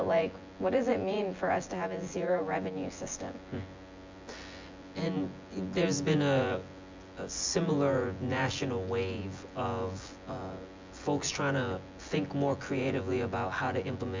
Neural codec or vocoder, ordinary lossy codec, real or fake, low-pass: vocoder, 24 kHz, 100 mel bands, Vocos; MP3, 64 kbps; fake; 7.2 kHz